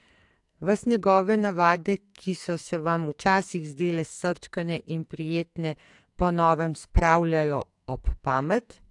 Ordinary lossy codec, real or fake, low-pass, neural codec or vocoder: AAC, 64 kbps; fake; 10.8 kHz; codec, 44.1 kHz, 2.6 kbps, SNAC